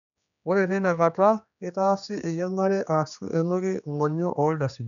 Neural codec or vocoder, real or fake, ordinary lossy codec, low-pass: codec, 16 kHz, 2 kbps, X-Codec, HuBERT features, trained on general audio; fake; none; 7.2 kHz